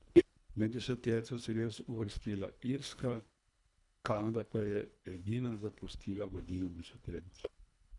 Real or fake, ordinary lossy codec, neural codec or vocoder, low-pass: fake; none; codec, 24 kHz, 1.5 kbps, HILCodec; 10.8 kHz